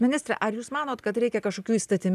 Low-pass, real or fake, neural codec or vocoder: 14.4 kHz; real; none